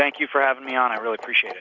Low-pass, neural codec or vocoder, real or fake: 7.2 kHz; none; real